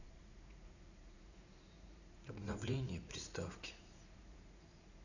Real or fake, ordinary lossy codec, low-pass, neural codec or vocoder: fake; none; 7.2 kHz; vocoder, 44.1 kHz, 80 mel bands, Vocos